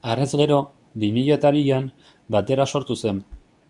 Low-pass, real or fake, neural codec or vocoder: 10.8 kHz; fake; codec, 24 kHz, 0.9 kbps, WavTokenizer, medium speech release version 2